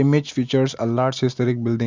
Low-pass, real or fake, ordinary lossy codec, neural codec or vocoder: 7.2 kHz; real; MP3, 64 kbps; none